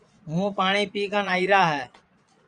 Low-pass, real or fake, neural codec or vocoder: 9.9 kHz; fake; vocoder, 22.05 kHz, 80 mel bands, Vocos